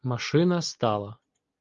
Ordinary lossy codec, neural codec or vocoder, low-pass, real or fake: Opus, 32 kbps; none; 7.2 kHz; real